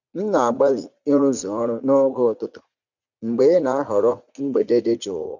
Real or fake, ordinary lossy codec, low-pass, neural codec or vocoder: fake; none; 7.2 kHz; vocoder, 44.1 kHz, 80 mel bands, Vocos